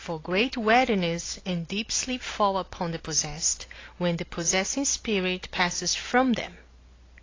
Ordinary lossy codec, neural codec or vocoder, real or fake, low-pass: AAC, 32 kbps; none; real; 7.2 kHz